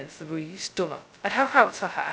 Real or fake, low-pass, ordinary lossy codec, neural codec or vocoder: fake; none; none; codec, 16 kHz, 0.2 kbps, FocalCodec